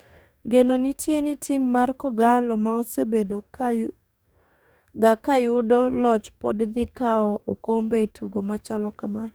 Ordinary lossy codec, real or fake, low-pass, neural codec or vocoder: none; fake; none; codec, 44.1 kHz, 2.6 kbps, DAC